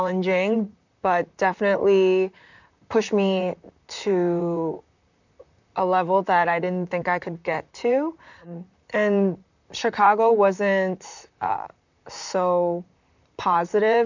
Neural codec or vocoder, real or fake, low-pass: vocoder, 44.1 kHz, 128 mel bands, Pupu-Vocoder; fake; 7.2 kHz